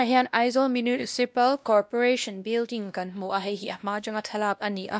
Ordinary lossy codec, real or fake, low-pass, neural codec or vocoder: none; fake; none; codec, 16 kHz, 1 kbps, X-Codec, WavLM features, trained on Multilingual LibriSpeech